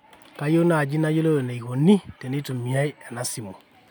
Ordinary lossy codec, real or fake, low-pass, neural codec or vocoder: none; real; none; none